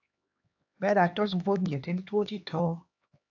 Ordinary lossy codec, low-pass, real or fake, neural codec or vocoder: AAC, 48 kbps; 7.2 kHz; fake; codec, 16 kHz, 2 kbps, X-Codec, HuBERT features, trained on LibriSpeech